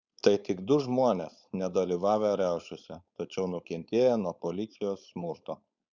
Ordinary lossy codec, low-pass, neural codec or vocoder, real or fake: Opus, 64 kbps; 7.2 kHz; codec, 16 kHz, 4.8 kbps, FACodec; fake